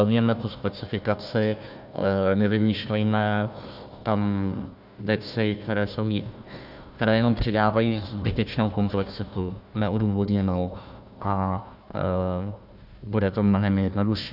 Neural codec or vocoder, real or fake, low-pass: codec, 16 kHz, 1 kbps, FunCodec, trained on Chinese and English, 50 frames a second; fake; 5.4 kHz